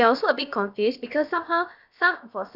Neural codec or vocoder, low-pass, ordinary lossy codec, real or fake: codec, 16 kHz, about 1 kbps, DyCAST, with the encoder's durations; 5.4 kHz; none; fake